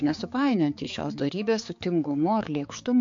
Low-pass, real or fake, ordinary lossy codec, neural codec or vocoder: 7.2 kHz; fake; MP3, 48 kbps; codec, 16 kHz, 4 kbps, X-Codec, HuBERT features, trained on balanced general audio